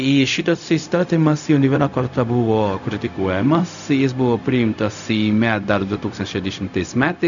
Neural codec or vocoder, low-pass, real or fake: codec, 16 kHz, 0.4 kbps, LongCat-Audio-Codec; 7.2 kHz; fake